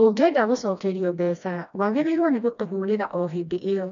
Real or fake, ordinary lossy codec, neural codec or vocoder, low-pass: fake; none; codec, 16 kHz, 1 kbps, FreqCodec, smaller model; 7.2 kHz